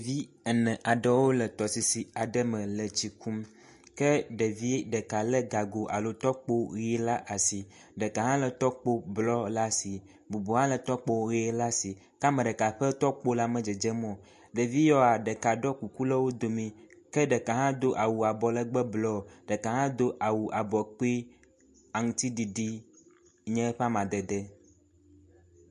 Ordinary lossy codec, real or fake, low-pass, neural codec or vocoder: MP3, 48 kbps; real; 14.4 kHz; none